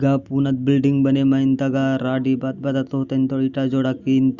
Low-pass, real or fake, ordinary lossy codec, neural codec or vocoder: 7.2 kHz; real; Opus, 64 kbps; none